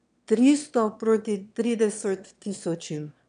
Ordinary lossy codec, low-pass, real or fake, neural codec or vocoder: none; 9.9 kHz; fake; autoencoder, 22.05 kHz, a latent of 192 numbers a frame, VITS, trained on one speaker